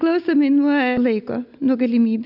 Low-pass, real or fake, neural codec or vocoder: 5.4 kHz; real; none